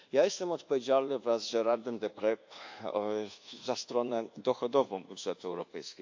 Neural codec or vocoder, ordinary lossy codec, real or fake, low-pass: codec, 24 kHz, 1.2 kbps, DualCodec; AAC, 48 kbps; fake; 7.2 kHz